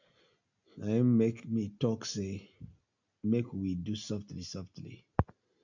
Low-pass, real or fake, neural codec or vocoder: 7.2 kHz; real; none